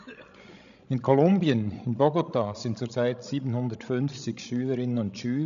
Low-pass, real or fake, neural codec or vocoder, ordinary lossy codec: 7.2 kHz; fake; codec, 16 kHz, 16 kbps, FreqCodec, larger model; none